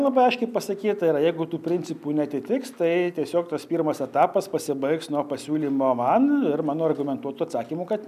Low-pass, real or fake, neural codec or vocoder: 14.4 kHz; real; none